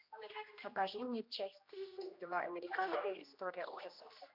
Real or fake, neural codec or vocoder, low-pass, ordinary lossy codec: fake; codec, 16 kHz, 1 kbps, X-Codec, HuBERT features, trained on general audio; 5.4 kHz; MP3, 48 kbps